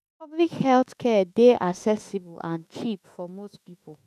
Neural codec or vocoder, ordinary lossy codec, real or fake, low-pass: autoencoder, 48 kHz, 32 numbers a frame, DAC-VAE, trained on Japanese speech; none; fake; 14.4 kHz